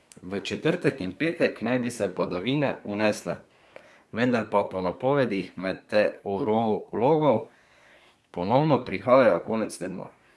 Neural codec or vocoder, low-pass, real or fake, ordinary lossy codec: codec, 24 kHz, 1 kbps, SNAC; none; fake; none